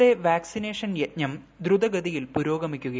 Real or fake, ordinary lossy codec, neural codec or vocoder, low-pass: real; none; none; none